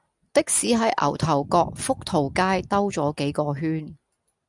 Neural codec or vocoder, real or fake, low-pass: none; real; 10.8 kHz